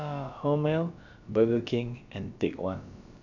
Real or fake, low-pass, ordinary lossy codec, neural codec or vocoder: fake; 7.2 kHz; none; codec, 16 kHz, about 1 kbps, DyCAST, with the encoder's durations